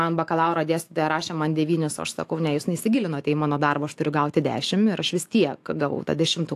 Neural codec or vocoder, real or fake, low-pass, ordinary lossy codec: autoencoder, 48 kHz, 128 numbers a frame, DAC-VAE, trained on Japanese speech; fake; 14.4 kHz; AAC, 64 kbps